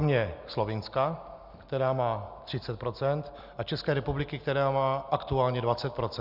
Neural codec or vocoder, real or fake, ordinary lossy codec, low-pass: none; real; Opus, 64 kbps; 5.4 kHz